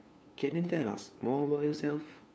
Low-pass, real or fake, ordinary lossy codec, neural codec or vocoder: none; fake; none; codec, 16 kHz, 2 kbps, FunCodec, trained on LibriTTS, 25 frames a second